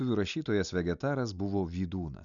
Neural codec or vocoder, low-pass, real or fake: none; 7.2 kHz; real